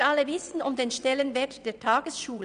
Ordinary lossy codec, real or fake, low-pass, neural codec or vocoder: none; fake; 9.9 kHz; vocoder, 22.05 kHz, 80 mel bands, WaveNeXt